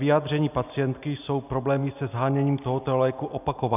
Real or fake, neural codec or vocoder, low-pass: real; none; 3.6 kHz